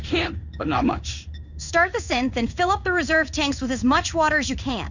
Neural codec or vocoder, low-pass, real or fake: codec, 16 kHz in and 24 kHz out, 1 kbps, XY-Tokenizer; 7.2 kHz; fake